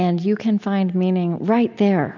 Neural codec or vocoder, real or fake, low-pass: none; real; 7.2 kHz